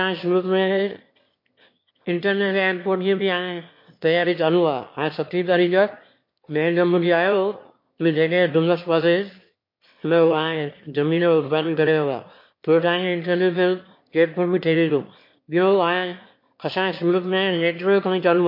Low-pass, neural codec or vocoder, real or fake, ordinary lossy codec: 5.4 kHz; autoencoder, 22.05 kHz, a latent of 192 numbers a frame, VITS, trained on one speaker; fake; MP3, 32 kbps